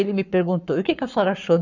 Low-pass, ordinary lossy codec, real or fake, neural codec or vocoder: 7.2 kHz; none; fake; codec, 44.1 kHz, 7.8 kbps, Pupu-Codec